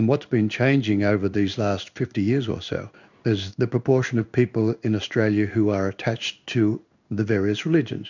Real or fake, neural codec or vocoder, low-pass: fake; codec, 16 kHz in and 24 kHz out, 1 kbps, XY-Tokenizer; 7.2 kHz